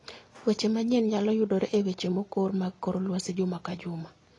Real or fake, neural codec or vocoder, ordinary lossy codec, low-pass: real; none; AAC, 32 kbps; 10.8 kHz